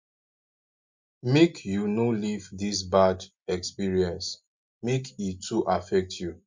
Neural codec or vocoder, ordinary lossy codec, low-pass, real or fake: none; MP3, 48 kbps; 7.2 kHz; real